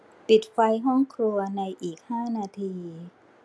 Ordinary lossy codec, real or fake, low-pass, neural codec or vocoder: none; real; none; none